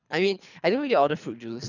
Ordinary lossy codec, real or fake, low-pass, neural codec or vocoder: none; fake; 7.2 kHz; codec, 24 kHz, 3 kbps, HILCodec